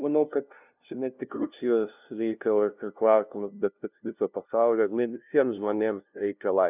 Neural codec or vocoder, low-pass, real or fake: codec, 16 kHz, 0.5 kbps, FunCodec, trained on LibriTTS, 25 frames a second; 3.6 kHz; fake